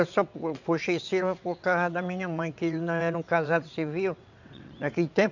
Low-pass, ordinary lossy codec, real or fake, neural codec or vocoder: 7.2 kHz; none; fake; vocoder, 22.05 kHz, 80 mel bands, WaveNeXt